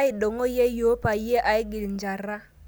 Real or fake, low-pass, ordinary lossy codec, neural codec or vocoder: real; none; none; none